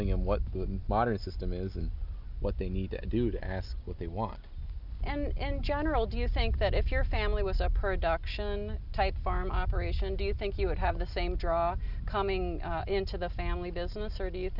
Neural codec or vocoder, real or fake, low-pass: none; real; 5.4 kHz